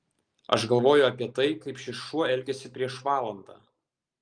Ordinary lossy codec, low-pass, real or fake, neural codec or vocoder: Opus, 32 kbps; 9.9 kHz; real; none